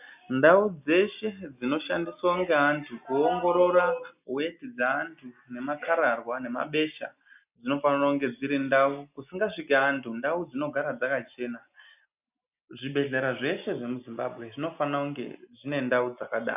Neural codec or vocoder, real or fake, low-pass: none; real; 3.6 kHz